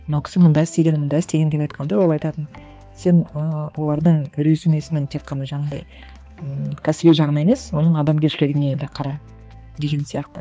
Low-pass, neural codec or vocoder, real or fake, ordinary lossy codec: none; codec, 16 kHz, 2 kbps, X-Codec, HuBERT features, trained on balanced general audio; fake; none